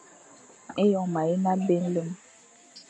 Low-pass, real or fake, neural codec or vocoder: 9.9 kHz; real; none